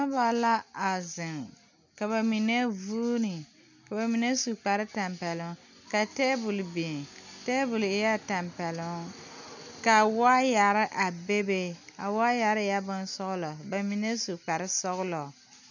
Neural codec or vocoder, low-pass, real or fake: none; 7.2 kHz; real